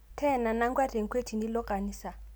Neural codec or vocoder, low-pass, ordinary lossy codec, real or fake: none; none; none; real